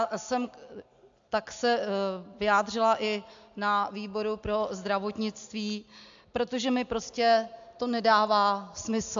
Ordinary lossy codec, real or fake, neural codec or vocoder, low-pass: AAC, 64 kbps; real; none; 7.2 kHz